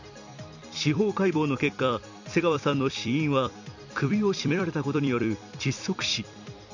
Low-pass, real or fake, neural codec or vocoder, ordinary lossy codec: 7.2 kHz; fake; vocoder, 44.1 kHz, 128 mel bands every 256 samples, BigVGAN v2; none